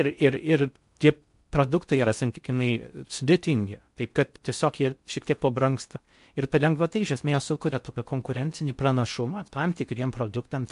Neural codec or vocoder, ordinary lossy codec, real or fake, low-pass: codec, 16 kHz in and 24 kHz out, 0.6 kbps, FocalCodec, streaming, 4096 codes; MP3, 64 kbps; fake; 10.8 kHz